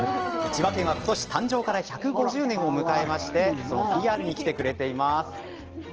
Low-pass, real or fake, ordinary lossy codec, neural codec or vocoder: 7.2 kHz; real; Opus, 16 kbps; none